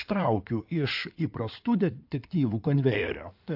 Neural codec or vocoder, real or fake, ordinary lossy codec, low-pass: codec, 16 kHz in and 24 kHz out, 2.2 kbps, FireRedTTS-2 codec; fake; AAC, 48 kbps; 5.4 kHz